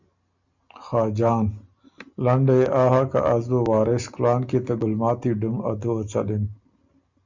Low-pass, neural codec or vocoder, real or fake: 7.2 kHz; none; real